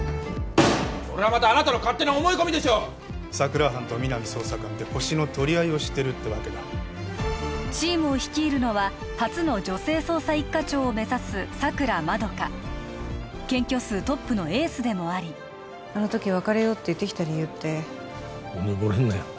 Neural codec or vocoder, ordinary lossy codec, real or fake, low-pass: none; none; real; none